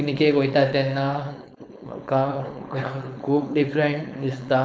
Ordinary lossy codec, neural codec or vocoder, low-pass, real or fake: none; codec, 16 kHz, 4.8 kbps, FACodec; none; fake